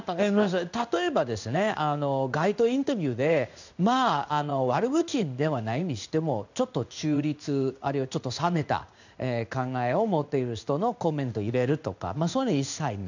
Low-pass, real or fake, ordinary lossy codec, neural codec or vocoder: 7.2 kHz; fake; none; codec, 16 kHz in and 24 kHz out, 1 kbps, XY-Tokenizer